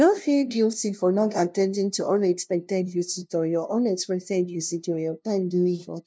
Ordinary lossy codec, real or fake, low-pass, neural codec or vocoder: none; fake; none; codec, 16 kHz, 0.5 kbps, FunCodec, trained on LibriTTS, 25 frames a second